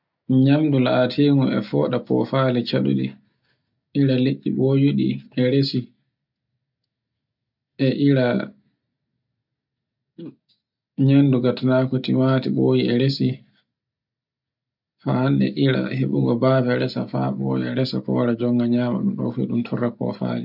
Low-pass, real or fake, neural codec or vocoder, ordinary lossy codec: 5.4 kHz; real; none; none